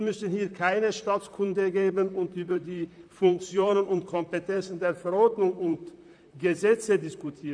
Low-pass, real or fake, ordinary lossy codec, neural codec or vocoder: 9.9 kHz; fake; none; vocoder, 44.1 kHz, 128 mel bands, Pupu-Vocoder